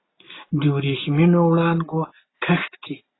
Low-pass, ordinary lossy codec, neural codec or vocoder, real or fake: 7.2 kHz; AAC, 16 kbps; none; real